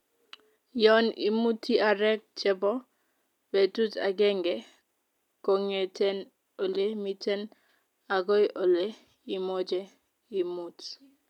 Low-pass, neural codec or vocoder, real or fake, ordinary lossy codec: 19.8 kHz; none; real; none